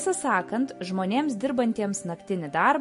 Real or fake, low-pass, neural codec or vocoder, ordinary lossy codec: real; 10.8 kHz; none; MP3, 48 kbps